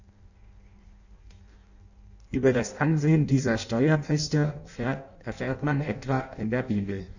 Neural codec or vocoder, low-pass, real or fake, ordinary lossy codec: codec, 16 kHz in and 24 kHz out, 0.6 kbps, FireRedTTS-2 codec; 7.2 kHz; fake; none